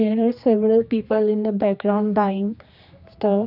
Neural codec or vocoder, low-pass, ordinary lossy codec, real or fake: codec, 16 kHz, 2 kbps, X-Codec, HuBERT features, trained on general audio; 5.4 kHz; none; fake